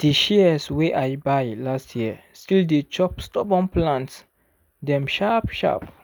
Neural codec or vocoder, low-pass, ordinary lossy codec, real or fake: none; none; none; real